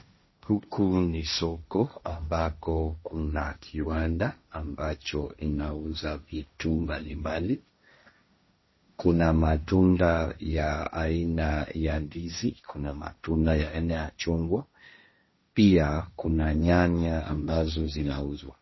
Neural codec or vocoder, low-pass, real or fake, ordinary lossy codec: codec, 16 kHz, 1.1 kbps, Voila-Tokenizer; 7.2 kHz; fake; MP3, 24 kbps